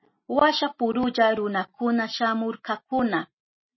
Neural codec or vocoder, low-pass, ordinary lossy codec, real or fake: none; 7.2 kHz; MP3, 24 kbps; real